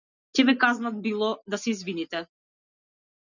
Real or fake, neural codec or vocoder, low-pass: real; none; 7.2 kHz